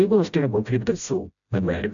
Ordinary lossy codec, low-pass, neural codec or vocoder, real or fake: MP3, 96 kbps; 7.2 kHz; codec, 16 kHz, 0.5 kbps, FreqCodec, smaller model; fake